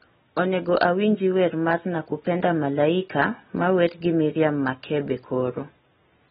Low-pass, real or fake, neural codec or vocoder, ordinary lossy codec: 19.8 kHz; real; none; AAC, 16 kbps